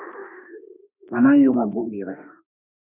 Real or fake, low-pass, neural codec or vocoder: fake; 3.6 kHz; autoencoder, 48 kHz, 32 numbers a frame, DAC-VAE, trained on Japanese speech